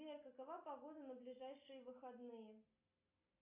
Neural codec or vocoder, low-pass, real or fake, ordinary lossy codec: none; 3.6 kHz; real; AAC, 32 kbps